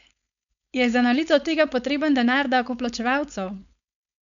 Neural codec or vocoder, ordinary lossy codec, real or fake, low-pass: codec, 16 kHz, 4.8 kbps, FACodec; none; fake; 7.2 kHz